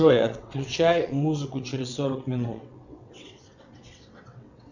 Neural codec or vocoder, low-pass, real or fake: vocoder, 22.05 kHz, 80 mel bands, WaveNeXt; 7.2 kHz; fake